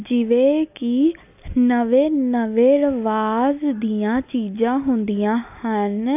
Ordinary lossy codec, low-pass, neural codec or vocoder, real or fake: AAC, 32 kbps; 3.6 kHz; none; real